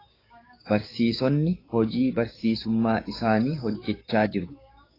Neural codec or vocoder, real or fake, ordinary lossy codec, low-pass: codec, 16 kHz, 6 kbps, DAC; fake; AAC, 24 kbps; 5.4 kHz